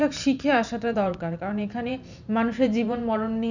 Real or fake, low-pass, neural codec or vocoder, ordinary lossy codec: fake; 7.2 kHz; vocoder, 44.1 kHz, 128 mel bands every 512 samples, BigVGAN v2; none